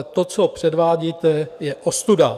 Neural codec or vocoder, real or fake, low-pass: codec, 44.1 kHz, 7.8 kbps, DAC; fake; 14.4 kHz